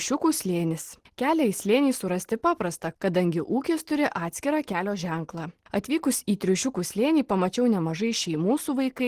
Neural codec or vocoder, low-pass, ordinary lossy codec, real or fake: none; 14.4 kHz; Opus, 16 kbps; real